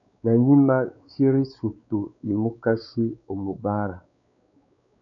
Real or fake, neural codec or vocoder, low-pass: fake; codec, 16 kHz, 4 kbps, X-Codec, WavLM features, trained on Multilingual LibriSpeech; 7.2 kHz